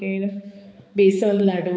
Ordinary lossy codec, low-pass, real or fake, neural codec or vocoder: none; none; fake; codec, 16 kHz, 4 kbps, X-Codec, HuBERT features, trained on balanced general audio